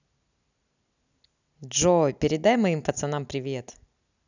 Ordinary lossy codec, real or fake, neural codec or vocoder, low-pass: none; real; none; 7.2 kHz